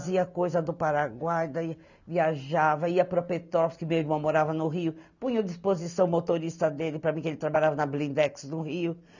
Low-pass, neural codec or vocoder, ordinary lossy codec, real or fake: 7.2 kHz; none; none; real